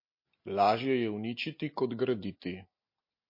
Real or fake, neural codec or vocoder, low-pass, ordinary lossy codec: real; none; 5.4 kHz; MP3, 32 kbps